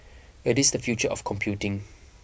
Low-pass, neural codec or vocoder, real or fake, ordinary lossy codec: none; none; real; none